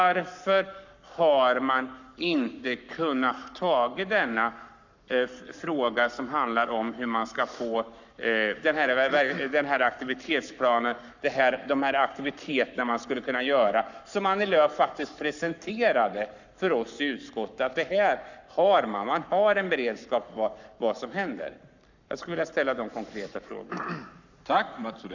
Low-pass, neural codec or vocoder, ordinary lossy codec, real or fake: 7.2 kHz; codec, 44.1 kHz, 7.8 kbps, Pupu-Codec; none; fake